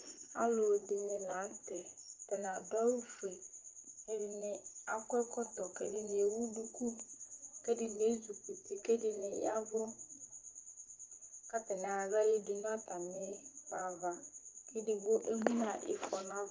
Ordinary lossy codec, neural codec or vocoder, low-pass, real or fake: Opus, 24 kbps; vocoder, 44.1 kHz, 80 mel bands, Vocos; 7.2 kHz; fake